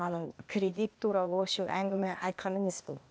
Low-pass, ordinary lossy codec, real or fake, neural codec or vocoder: none; none; fake; codec, 16 kHz, 0.8 kbps, ZipCodec